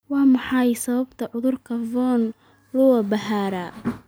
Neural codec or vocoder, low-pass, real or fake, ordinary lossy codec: none; none; real; none